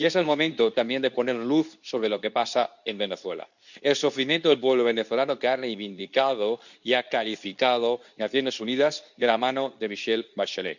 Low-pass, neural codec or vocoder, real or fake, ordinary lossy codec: 7.2 kHz; codec, 24 kHz, 0.9 kbps, WavTokenizer, medium speech release version 2; fake; none